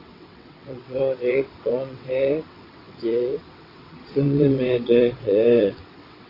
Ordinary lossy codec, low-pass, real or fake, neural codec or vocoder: AAC, 24 kbps; 5.4 kHz; fake; vocoder, 22.05 kHz, 80 mel bands, WaveNeXt